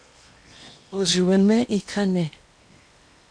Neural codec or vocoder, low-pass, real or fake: codec, 16 kHz in and 24 kHz out, 0.8 kbps, FocalCodec, streaming, 65536 codes; 9.9 kHz; fake